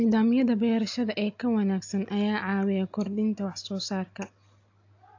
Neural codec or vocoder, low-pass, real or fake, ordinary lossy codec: none; 7.2 kHz; real; AAC, 48 kbps